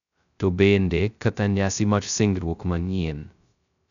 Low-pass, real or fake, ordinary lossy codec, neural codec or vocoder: 7.2 kHz; fake; none; codec, 16 kHz, 0.2 kbps, FocalCodec